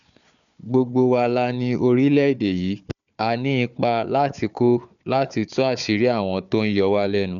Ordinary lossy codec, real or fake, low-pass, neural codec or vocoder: none; fake; 7.2 kHz; codec, 16 kHz, 4 kbps, FunCodec, trained on Chinese and English, 50 frames a second